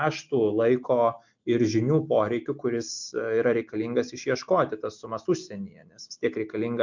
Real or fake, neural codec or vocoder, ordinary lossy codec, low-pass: real; none; MP3, 64 kbps; 7.2 kHz